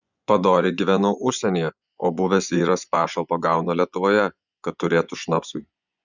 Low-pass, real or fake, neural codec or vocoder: 7.2 kHz; fake; vocoder, 24 kHz, 100 mel bands, Vocos